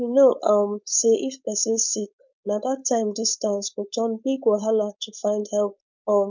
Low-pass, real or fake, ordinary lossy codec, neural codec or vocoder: 7.2 kHz; fake; none; codec, 16 kHz, 4.8 kbps, FACodec